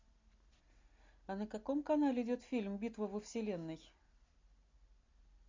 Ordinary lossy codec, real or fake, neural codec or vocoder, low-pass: MP3, 64 kbps; real; none; 7.2 kHz